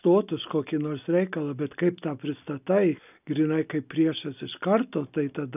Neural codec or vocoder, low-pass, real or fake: none; 3.6 kHz; real